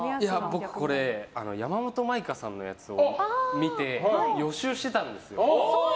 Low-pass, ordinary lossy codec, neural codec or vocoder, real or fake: none; none; none; real